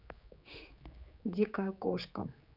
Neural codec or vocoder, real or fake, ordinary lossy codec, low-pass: codec, 16 kHz, 4 kbps, X-Codec, HuBERT features, trained on general audio; fake; none; 5.4 kHz